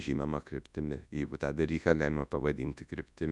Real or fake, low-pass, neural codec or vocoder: fake; 10.8 kHz; codec, 24 kHz, 0.9 kbps, WavTokenizer, large speech release